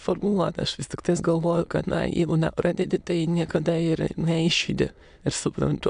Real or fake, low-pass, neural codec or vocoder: fake; 9.9 kHz; autoencoder, 22.05 kHz, a latent of 192 numbers a frame, VITS, trained on many speakers